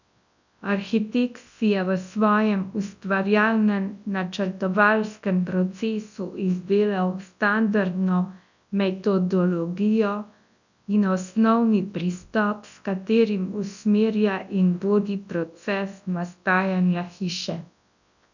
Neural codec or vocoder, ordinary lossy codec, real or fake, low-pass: codec, 24 kHz, 0.9 kbps, WavTokenizer, large speech release; none; fake; 7.2 kHz